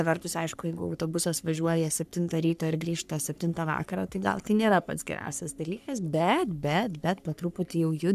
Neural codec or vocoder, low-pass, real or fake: codec, 44.1 kHz, 3.4 kbps, Pupu-Codec; 14.4 kHz; fake